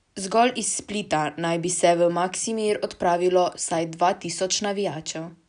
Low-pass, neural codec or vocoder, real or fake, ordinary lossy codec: 9.9 kHz; none; real; none